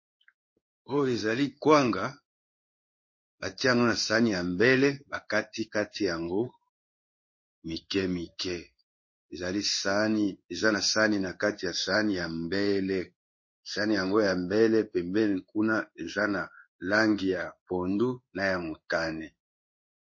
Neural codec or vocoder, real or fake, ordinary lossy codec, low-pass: codec, 16 kHz in and 24 kHz out, 1 kbps, XY-Tokenizer; fake; MP3, 32 kbps; 7.2 kHz